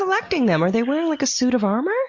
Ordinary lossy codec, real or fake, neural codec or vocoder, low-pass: MP3, 48 kbps; real; none; 7.2 kHz